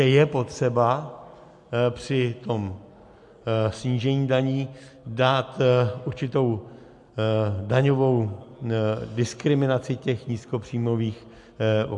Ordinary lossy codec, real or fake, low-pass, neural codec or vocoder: MP3, 64 kbps; real; 10.8 kHz; none